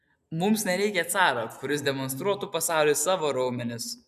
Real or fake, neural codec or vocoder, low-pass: real; none; 14.4 kHz